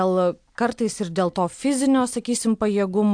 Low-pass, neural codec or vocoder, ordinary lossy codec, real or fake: 9.9 kHz; none; AAC, 64 kbps; real